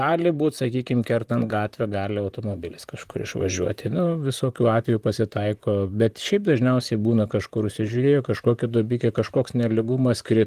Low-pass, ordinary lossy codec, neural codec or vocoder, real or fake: 14.4 kHz; Opus, 24 kbps; vocoder, 44.1 kHz, 128 mel bands, Pupu-Vocoder; fake